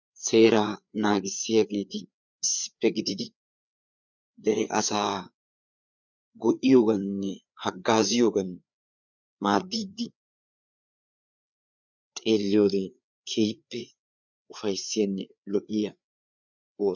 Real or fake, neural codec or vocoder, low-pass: fake; codec, 16 kHz, 4 kbps, FreqCodec, larger model; 7.2 kHz